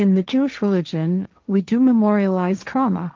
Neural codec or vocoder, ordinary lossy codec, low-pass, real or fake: codec, 16 kHz, 1.1 kbps, Voila-Tokenizer; Opus, 24 kbps; 7.2 kHz; fake